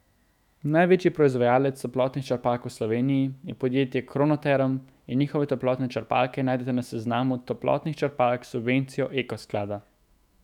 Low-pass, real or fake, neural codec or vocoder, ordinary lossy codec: 19.8 kHz; fake; autoencoder, 48 kHz, 128 numbers a frame, DAC-VAE, trained on Japanese speech; none